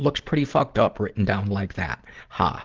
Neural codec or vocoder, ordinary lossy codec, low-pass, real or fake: none; Opus, 16 kbps; 7.2 kHz; real